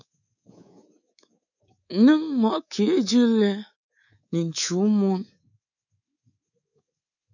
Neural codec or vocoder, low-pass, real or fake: codec, 24 kHz, 3.1 kbps, DualCodec; 7.2 kHz; fake